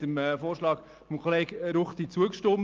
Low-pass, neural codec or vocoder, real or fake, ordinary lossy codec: 7.2 kHz; none; real; Opus, 24 kbps